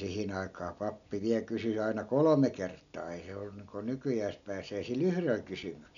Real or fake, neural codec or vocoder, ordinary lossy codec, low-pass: real; none; none; 7.2 kHz